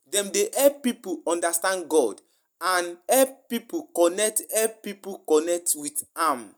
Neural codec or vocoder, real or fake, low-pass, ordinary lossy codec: none; real; none; none